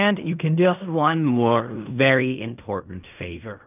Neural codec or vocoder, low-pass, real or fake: codec, 16 kHz in and 24 kHz out, 0.4 kbps, LongCat-Audio-Codec, fine tuned four codebook decoder; 3.6 kHz; fake